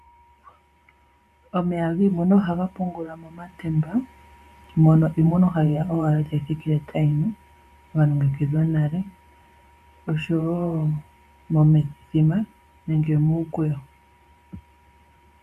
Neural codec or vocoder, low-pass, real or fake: vocoder, 44.1 kHz, 128 mel bands every 512 samples, BigVGAN v2; 14.4 kHz; fake